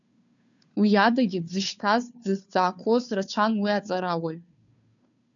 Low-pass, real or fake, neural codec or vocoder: 7.2 kHz; fake; codec, 16 kHz, 2 kbps, FunCodec, trained on Chinese and English, 25 frames a second